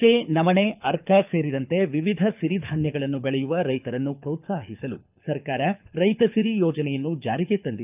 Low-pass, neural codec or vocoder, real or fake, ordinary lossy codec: 3.6 kHz; codec, 24 kHz, 6 kbps, HILCodec; fake; none